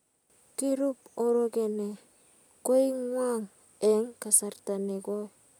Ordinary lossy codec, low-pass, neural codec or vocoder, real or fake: none; none; none; real